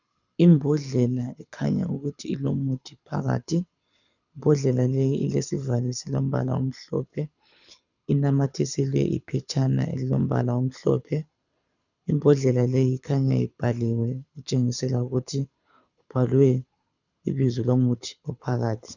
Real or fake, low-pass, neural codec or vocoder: fake; 7.2 kHz; codec, 24 kHz, 6 kbps, HILCodec